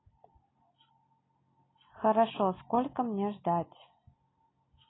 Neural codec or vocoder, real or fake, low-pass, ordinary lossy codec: none; real; 7.2 kHz; AAC, 16 kbps